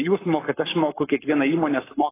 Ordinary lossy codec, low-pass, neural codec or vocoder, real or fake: AAC, 16 kbps; 3.6 kHz; codec, 44.1 kHz, 7.8 kbps, Pupu-Codec; fake